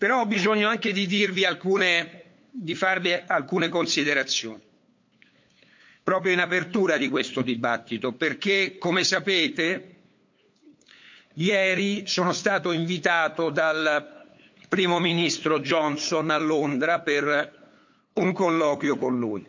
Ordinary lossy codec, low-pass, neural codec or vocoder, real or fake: MP3, 48 kbps; 7.2 kHz; codec, 16 kHz, 8 kbps, FunCodec, trained on LibriTTS, 25 frames a second; fake